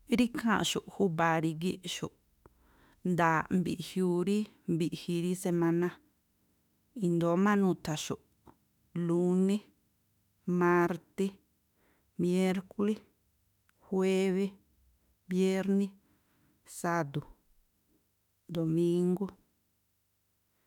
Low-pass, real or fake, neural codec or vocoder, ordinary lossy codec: 19.8 kHz; fake; autoencoder, 48 kHz, 32 numbers a frame, DAC-VAE, trained on Japanese speech; none